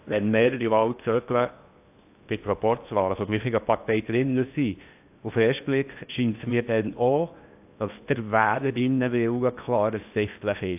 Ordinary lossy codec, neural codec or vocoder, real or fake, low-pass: AAC, 32 kbps; codec, 16 kHz in and 24 kHz out, 0.8 kbps, FocalCodec, streaming, 65536 codes; fake; 3.6 kHz